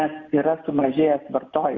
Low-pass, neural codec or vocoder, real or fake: 7.2 kHz; none; real